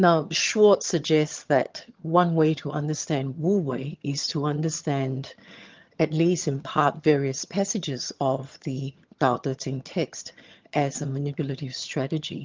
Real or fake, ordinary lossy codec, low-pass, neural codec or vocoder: fake; Opus, 32 kbps; 7.2 kHz; vocoder, 22.05 kHz, 80 mel bands, HiFi-GAN